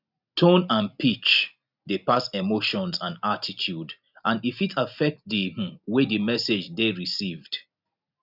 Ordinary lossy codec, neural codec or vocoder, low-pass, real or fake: none; none; 5.4 kHz; real